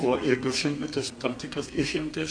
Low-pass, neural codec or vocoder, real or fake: 9.9 kHz; codec, 32 kHz, 1.9 kbps, SNAC; fake